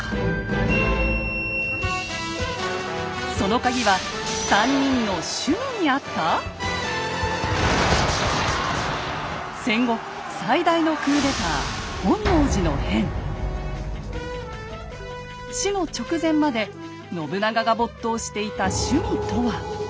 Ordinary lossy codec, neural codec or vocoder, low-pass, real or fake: none; none; none; real